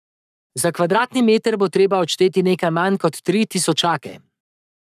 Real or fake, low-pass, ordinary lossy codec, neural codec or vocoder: fake; 14.4 kHz; none; codec, 44.1 kHz, 7.8 kbps, Pupu-Codec